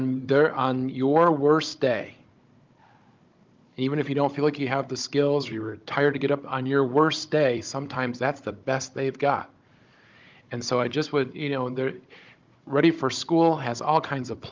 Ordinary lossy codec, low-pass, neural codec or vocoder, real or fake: Opus, 24 kbps; 7.2 kHz; codec, 16 kHz, 16 kbps, FunCodec, trained on Chinese and English, 50 frames a second; fake